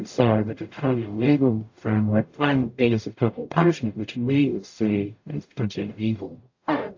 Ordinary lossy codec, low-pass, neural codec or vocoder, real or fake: AAC, 48 kbps; 7.2 kHz; codec, 44.1 kHz, 0.9 kbps, DAC; fake